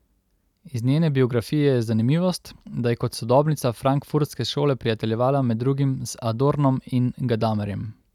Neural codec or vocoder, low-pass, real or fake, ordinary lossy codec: none; 19.8 kHz; real; none